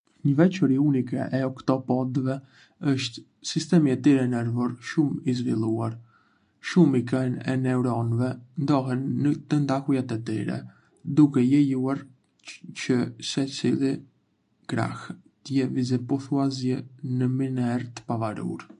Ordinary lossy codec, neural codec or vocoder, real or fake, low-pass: MP3, 64 kbps; none; real; 9.9 kHz